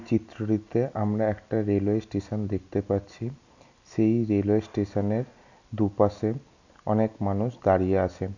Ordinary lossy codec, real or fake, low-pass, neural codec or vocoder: none; real; 7.2 kHz; none